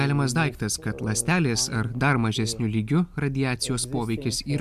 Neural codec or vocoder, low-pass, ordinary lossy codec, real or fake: none; 14.4 kHz; MP3, 96 kbps; real